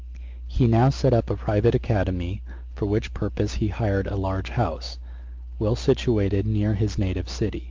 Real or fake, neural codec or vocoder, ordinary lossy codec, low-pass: real; none; Opus, 32 kbps; 7.2 kHz